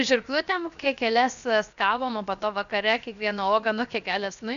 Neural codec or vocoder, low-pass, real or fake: codec, 16 kHz, 0.7 kbps, FocalCodec; 7.2 kHz; fake